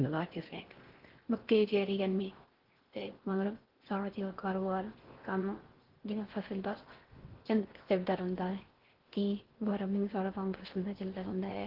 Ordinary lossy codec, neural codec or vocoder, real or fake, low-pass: Opus, 16 kbps; codec, 16 kHz in and 24 kHz out, 0.6 kbps, FocalCodec, streaming, 4096 codes; fake; 5.4 kHz